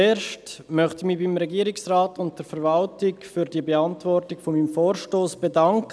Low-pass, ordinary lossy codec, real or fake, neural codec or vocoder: none; none; real; none